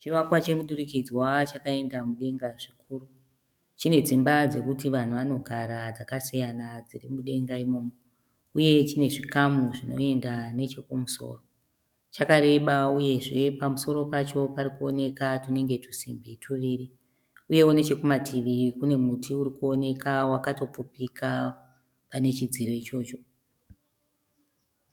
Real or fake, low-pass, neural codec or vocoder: fake; 19.8 kHz; codec, 44.1 kHz, 7.8 kbps, DAC